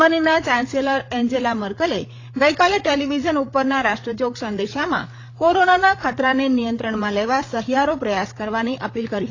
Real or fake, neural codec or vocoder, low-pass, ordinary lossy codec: fake; codec, 16 kHz, 8 kbps, FreqCodec, larger model; 7.2 kHz; AAC, 32 kbps